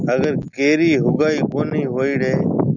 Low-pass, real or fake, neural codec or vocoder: 7.2 kHz; real; none